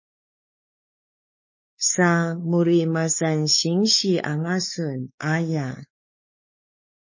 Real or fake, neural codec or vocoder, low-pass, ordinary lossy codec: fake; codec, 16 kHz, 4 kbps, X-Codec, WavLM features, trained on Multilingual LibriSpeech; 7.2 kHz; MP3, 32 kbps